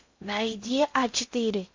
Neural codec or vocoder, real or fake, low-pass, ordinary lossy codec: codec, 16 kHz in and 24 kHz out, 0.6 kbps, FocalCodec, streaming, 4096 codes; fake; 7.2 kHz; MP3, 48 kbps